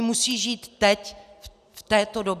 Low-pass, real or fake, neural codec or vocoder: 14.4 kHz; real; none